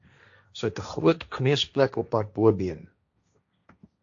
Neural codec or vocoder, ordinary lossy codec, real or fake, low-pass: codec, 16 kHz, 1.1 kbps, Voila-Tokenizer; AAC, 64 kbps; fake; 7.2 kHz